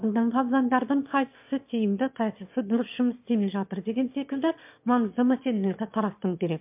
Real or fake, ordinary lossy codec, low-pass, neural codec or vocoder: fake; MP3, 32 kbps; 3.6 kHz; autoencoder, 22.05 kHz, a latent of 192 numbers a frame, VITS, trained on one speaker